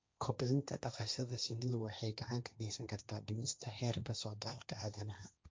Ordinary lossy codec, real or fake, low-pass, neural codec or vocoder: none; fake; none; codec, 16 kHz, 1.1 kbps, Voila-Tokenizer